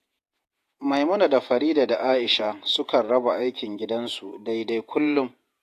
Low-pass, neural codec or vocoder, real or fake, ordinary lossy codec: 14.4 kHz; vocoder, 48 kHz, 128 mel bands, Vocos; fake; AAC, 64 kbps